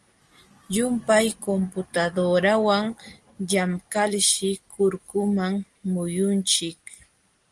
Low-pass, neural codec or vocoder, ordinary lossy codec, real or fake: 10.8 kHz; none; Opus, 24 kbps; real